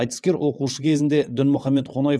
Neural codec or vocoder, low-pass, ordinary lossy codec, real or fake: vocoder, 22.05 kHz, 80 mel bands, WaveNeXt; none; none; fake